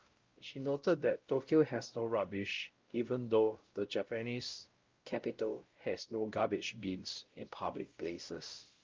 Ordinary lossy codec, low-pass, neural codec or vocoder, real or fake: Opus, 32 kbps; 7.2 kHz; codec, 16 kHz, 0.5 kbps, X-Codec, WavLM features, trained on Multilingual LibriSpeech; fake